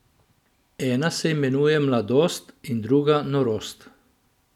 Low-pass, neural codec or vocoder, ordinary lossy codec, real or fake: 19.8 kHz; none; none; real